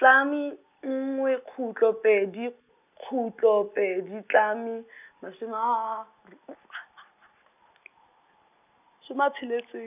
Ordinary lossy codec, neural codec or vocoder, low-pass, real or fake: none; none; 3.6 kHz; real